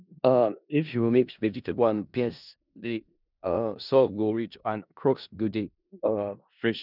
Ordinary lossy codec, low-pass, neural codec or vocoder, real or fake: none; 5.4 kHz; codec, 16 kHz in and 24 kHz out, 0.4 kbps, LongCat-Audio-Codec, four codebook decoder; fake